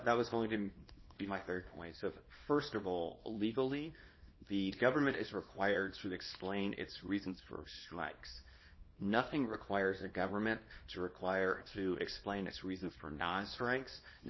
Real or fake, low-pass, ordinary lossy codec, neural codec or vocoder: fake; 7.2 kHz; MP3, 24 kbps; codec, 24 kHz, 0.9 kbps, WavTokenizer, small release